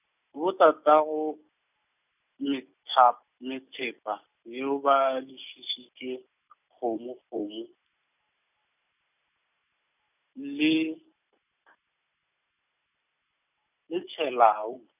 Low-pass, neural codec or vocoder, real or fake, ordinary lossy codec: 3.6 kHz; none; real; none